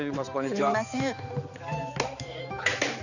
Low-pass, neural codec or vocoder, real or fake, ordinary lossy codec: 7.2 kHz; codec, 16 kHz, 4 kbps, X-Codec, HuBERT features, trained on balanced general audio; fake; none